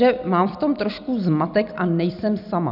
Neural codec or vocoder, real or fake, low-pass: none; real; 5.4 kHz